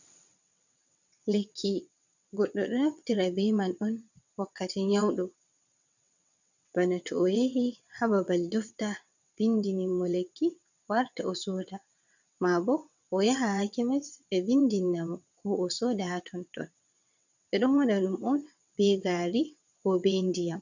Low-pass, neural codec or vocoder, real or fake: 7.2 kHz; vocoder, 22.05 kHz, 80 mel bands, WaveNeXt; fake